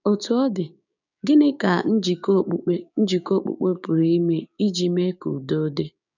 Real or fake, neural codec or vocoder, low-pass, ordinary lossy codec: fake; codec, 16 kHz, 6 kbps, DAC; 7.2 kHz; none